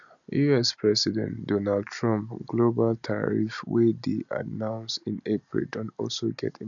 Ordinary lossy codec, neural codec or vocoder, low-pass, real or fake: none; none; 7.2 kHz; real